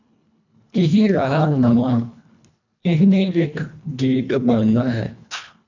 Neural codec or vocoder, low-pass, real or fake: codec, 24 kHz, 1.5 kbps, HILCodec; 7.2 kHz; fake